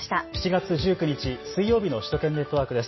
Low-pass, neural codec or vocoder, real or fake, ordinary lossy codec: 7.2 kHz; none; real; MP3, 24 kbps